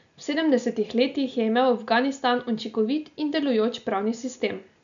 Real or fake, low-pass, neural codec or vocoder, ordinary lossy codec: real; 7.2 kHz; none; none